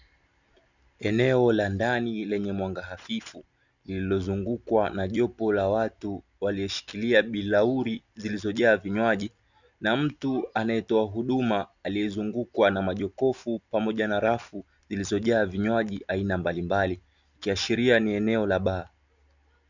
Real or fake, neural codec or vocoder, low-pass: real; none; 7.2 kHz